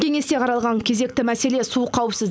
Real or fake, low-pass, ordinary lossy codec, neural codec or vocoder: real; none; none; none